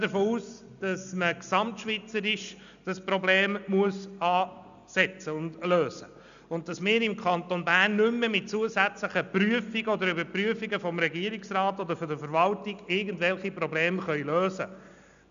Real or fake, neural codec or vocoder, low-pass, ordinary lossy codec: real; none; 7.2 kHz; none